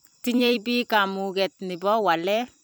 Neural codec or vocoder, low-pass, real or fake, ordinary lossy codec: vocoder, 44.1 kHz, 128 mel bands every 256 samples, BigVGAN v2; none; fake; none